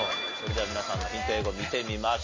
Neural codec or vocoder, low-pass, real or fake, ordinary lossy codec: none; 7.2 kHz; real; MP3, 32 kbps